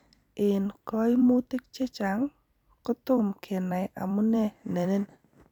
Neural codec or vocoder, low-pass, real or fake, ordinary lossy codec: vocoder, 44.1 kHz, 128 mel bands every 256 samples, BigVGAN v2; 19.8 kHz; fake; none